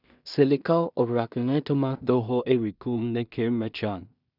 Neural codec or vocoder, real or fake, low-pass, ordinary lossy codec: codec, 16 kHz in and 24 kHz out, 0.4 kbps, LongCat-Audio-Codec, two codebook decoder; fake; 5.4 kHz; none